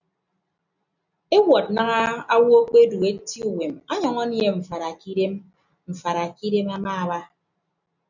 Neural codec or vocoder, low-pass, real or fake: none; 7.2 kHz; real